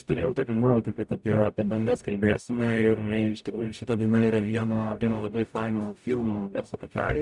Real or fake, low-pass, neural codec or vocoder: fake; 10.8 kHz; codec, 44.1 kHz, 0.9 kbps, DAC